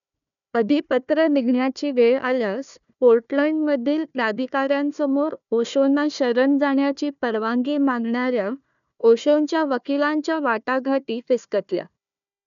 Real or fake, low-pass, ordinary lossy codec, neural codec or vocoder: fake; 7.2 kHz; none; codec, 16 kHz, 1 kbps, FunCodec, trained on Chinese and English, 50 frames a second